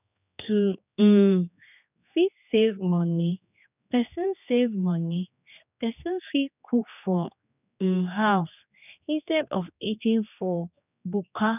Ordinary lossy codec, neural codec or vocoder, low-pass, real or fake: none; codec, 16 kHz, 2 kbps, X-Codec, HuBERT features, trained on general audio; 3.6 kHz; fake